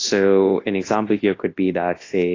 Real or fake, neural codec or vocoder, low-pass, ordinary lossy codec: fake; codec, 24 kHz, 0.9 kbps, WavTokenizer, large speech release; 7.2 kHz; AAC, 32 kbps